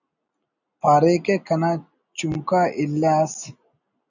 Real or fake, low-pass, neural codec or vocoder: real; 7.2 kHz; none